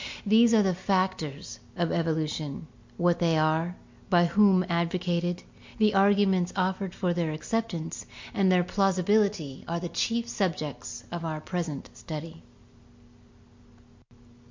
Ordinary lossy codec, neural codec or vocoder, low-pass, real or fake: MP3, 48 kbps; none; 7.2 kHz; real